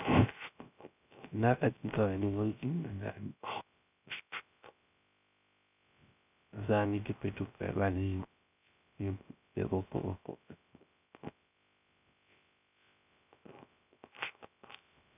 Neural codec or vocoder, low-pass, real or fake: codec, 16 kHz, 0.3 kbps, FocalCodec; 3.6 kHz; fake